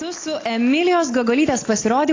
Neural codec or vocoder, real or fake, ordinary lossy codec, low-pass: none; real; AAC, 32 kbps; 7.2 kHz